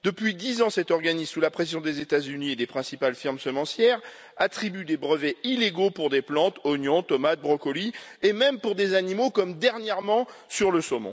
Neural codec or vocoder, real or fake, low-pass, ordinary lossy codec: none; real; none; none